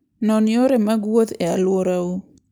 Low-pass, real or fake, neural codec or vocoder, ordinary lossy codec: none; real; none; none